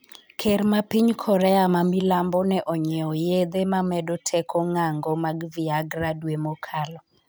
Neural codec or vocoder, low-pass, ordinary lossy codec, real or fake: none; none; none; real